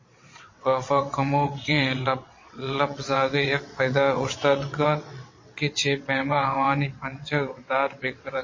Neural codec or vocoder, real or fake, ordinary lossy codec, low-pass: vocoder, 22.05 kHz, 80 mel bands, WaveNeXt; fake; MP3, 32 kbps; 7.2 kHz